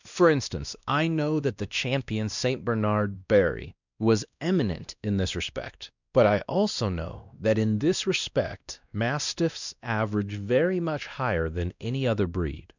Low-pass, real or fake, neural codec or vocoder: 7.2 kHz; fake; codec, 16 kHz, 1 kbps, X-Codec, WavLM features, trained on Multilingual LibriSpeech